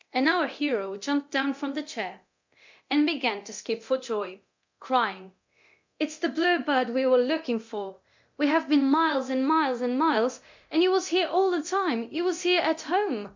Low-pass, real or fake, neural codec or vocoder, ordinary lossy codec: 7.2 kHz; fake; codec, 24 kHz, 0.9 kbps, DualCodec; MP3, 64 kbps